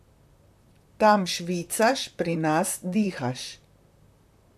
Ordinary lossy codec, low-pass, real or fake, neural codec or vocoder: AAC, 96 kbps; 14.4 kHz; fake; vocoder, 44.1 kHz, 128 mel bands, Pupu-Vocoder